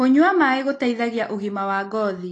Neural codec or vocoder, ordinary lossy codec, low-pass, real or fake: none; AAC, 32 kbps; 10.8 kHz; real